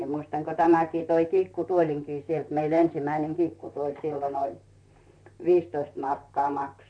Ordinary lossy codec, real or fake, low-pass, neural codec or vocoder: none; fake; 9.9 kHz; vocoder, 44.1 kHz, 128 mel bands, Pupu-Vocoder